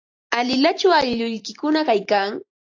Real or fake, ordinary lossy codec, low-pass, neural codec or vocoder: real; AAC, 48 kbps; 7.2 kHz; none